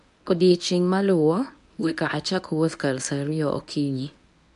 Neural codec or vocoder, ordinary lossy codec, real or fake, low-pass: codec, 24 kHz, 0.9 kbps, WavTokenizer, medium speech release version 1; none; fake; 10.8 kHz